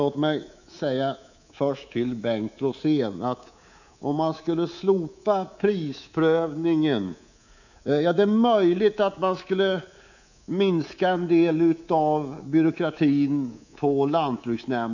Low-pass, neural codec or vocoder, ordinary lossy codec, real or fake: 7.2 kHz; codec, 24 kHz, 3.1 kbps, DualCodec; none; fake